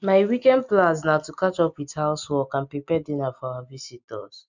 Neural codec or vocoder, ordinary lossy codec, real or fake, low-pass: none; none; real; 7.2 kHz